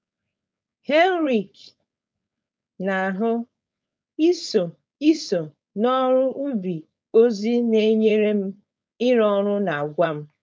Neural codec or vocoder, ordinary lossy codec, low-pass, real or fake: codec, 16 kHz, 4.8 kbps, FACodec; none; none; fake